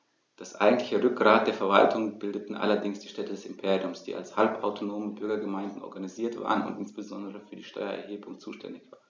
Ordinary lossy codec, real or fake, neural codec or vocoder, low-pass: none; real; none; none